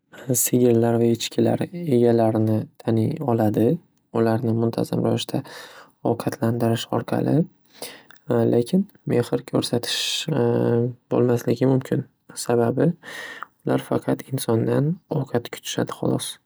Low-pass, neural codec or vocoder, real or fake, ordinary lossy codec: none; none; real; none